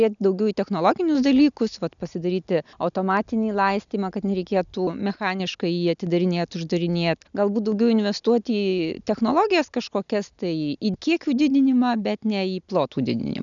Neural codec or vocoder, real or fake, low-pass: none; real; 7.2 kHz